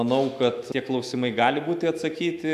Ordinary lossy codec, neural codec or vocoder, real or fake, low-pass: AAC, 96 kbps; autoencoder, 48 kHz, 128 numbers a frame, DAC-VAE, trained on Japanese speech; fake; 14.4 kHz